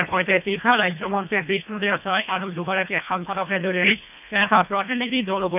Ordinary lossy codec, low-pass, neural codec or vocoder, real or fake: none; 3.6 kHz; codec, 24 kHz, 1.5 kbps, HILCodec; fake